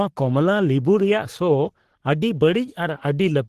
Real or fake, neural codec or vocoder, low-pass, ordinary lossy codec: fake; codec, 44.1 kHz, 3.4 kbps, Pupu-Codec; 14.4 kHz; Opus, 16 kbps